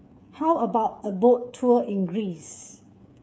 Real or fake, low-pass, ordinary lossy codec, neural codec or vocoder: fake; none; none; codec, 16 kHz, 8 kbps, FreqCodec, smaller model